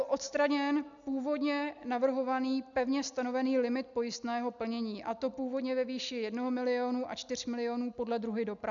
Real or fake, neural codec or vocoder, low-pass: real; none; 7.2 kHz